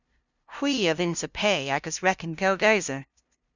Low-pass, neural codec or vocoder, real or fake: 7.2 kHz; codec, 16 kHz, 0.5 kbps, FunCodec, trained on LibriTTS, 25 frames a second; fake